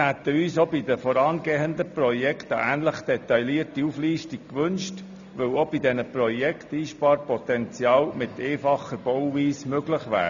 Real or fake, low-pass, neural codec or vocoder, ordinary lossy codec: real; 7.2 kHz; none; none